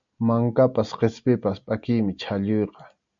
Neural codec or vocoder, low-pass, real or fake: none; 7.2 kHz; real